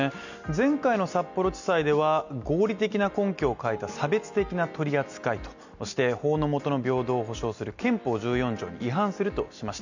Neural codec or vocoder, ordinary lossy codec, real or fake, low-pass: none; none; real; 7.2 kHz